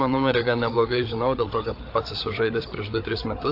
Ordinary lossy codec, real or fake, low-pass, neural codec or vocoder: AAC, 32 kbps; fake; 5.4 kHz; codec, 16 kHz, 8 kbps, FreqCodec, larger model